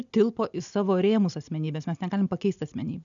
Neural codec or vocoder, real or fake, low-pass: none; real; 7.2 kHz